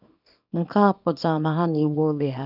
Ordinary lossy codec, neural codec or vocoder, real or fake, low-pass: none; codec, 24 kHz, 0.9 kbps, WavTokenizer, small release; fake; 5.4 kHz